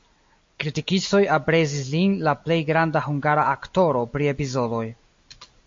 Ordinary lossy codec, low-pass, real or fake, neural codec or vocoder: MP3, 48 kbps; 7.2 kHz; real; none